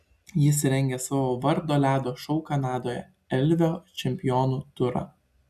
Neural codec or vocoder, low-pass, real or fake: none; 14.4 kHz; real